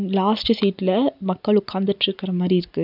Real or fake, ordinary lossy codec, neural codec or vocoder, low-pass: real; none; none; 5.4 kHz